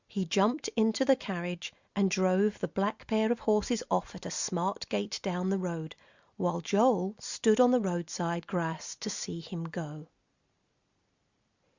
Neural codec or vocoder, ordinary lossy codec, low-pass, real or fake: none; Opus, 64 kbps; 7.2 kHz; real